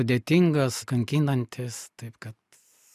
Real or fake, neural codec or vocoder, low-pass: real; none; 14.4 kHz